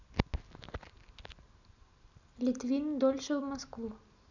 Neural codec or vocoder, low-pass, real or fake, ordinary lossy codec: none; 7.2 kHz; real; none